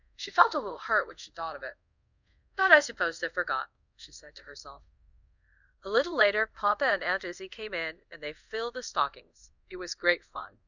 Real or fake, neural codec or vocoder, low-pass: fake; codec, 24 kHz, 0.5 kbps, DualCodec; 7.2 kHz